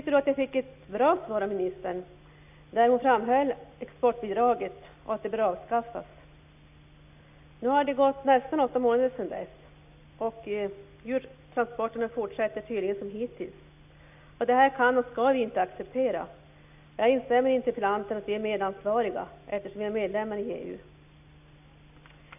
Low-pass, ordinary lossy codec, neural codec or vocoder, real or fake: 3.6 kHz; AAC, 32 kbps; none; real